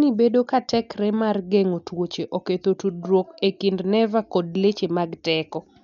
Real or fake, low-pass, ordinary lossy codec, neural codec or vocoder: real; 7.2 kHz; MP3, 64 kbps; none